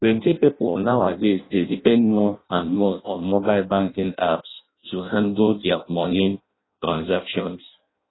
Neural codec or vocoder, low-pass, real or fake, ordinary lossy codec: codec, 16 kHz in and 24 kHz out, 0.6 kbps, FireRedTTS-2 codec; 7.2 kHz; fake; AAC, 16 kbps